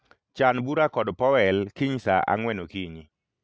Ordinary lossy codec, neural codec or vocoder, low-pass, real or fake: none; none; none; real